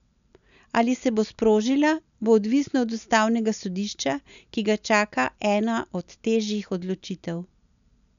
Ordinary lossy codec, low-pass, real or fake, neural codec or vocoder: none; 7.2 kHz; real; none